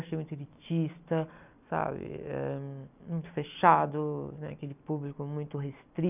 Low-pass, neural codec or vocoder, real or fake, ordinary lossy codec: 3.6 kHz; none; real; none